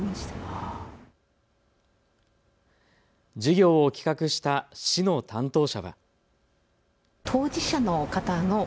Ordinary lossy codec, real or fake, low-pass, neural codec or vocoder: none; real; none; none